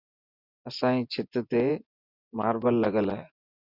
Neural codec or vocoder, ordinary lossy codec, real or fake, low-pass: vocoder, 24 kHz, 100 mel bands, Vocos; Opus, 64 kbps; fake; 5.4 kHz